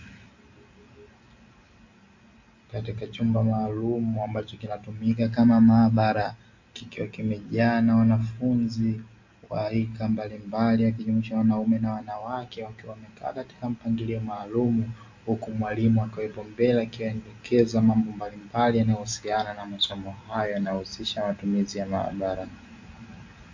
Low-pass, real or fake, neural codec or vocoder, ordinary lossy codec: 7.2 kHz; real; none; AAC, 48 kbps